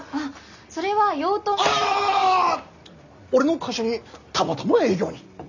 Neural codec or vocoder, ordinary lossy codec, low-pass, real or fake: none; none; 7.2 kHz; real